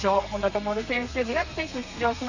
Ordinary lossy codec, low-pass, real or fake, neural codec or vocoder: none; 7.2 kHz; fake; codec, 32 kHz, 1.9 kbps, SNAC